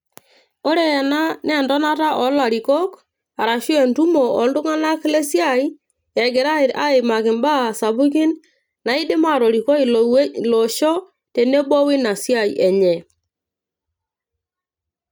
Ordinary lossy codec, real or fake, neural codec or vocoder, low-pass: none; real; none; none